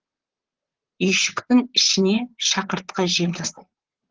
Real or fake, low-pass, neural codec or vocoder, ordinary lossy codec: fake; 7.2 kHz; vocoder, 44.1 kHz, 128 mel bands, Pupu-Vocoder; Opus, 16 kbps